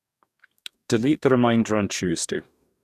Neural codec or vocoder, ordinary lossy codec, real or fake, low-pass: codec, 44.1 kHz, 2.6 kbps, DAC; none; fake; 14.4 kHz